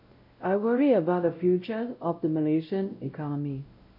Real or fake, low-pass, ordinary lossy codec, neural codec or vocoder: fake; 5.4 kHz; MP3, 32 kbps; codec, 16 kHz, 0.5 kbps, X-Codec, WavLM features, trained on Multilingual LibriSpeech